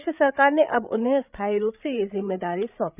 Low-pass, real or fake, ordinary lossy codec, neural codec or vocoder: 3.6 kHz; fake; none; codec, 16 kHz, 8 kbps, FreqCodec, larger model